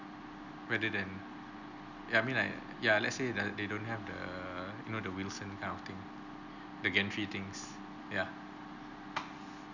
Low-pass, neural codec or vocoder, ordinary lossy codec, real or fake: 7.2 kHz; none; none; real